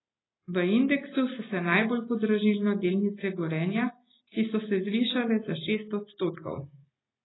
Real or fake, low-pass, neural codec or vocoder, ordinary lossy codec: real; 7.2 kHz; none; AAC, 16 kbps